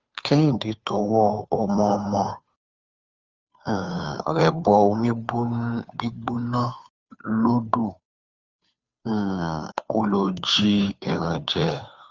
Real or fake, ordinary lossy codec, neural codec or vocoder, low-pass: fake; Opus, 32 kbps; codec, 16 kHz, 2 kbps, FunCodec, trained on Chinese and English, 25 frames a second; 7.2 kHz